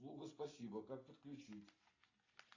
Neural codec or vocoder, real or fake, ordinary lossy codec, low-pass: none; real; AAC, 32 kbps; 7.2 kHz